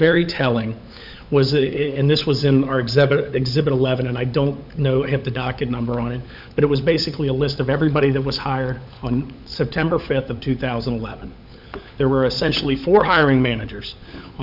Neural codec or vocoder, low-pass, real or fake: codec, 16 kHz, 16 kbps, FunCodec, trained on LibriTTS, 50 frames a second; 5.4 kHz; fake